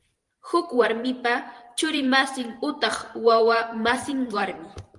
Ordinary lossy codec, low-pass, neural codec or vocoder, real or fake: Opus, 24 kbps; 10.8 kHz; vocoder, 44.1 kHz, 128 mel bands every 512 samples, BigVGAN v2; fake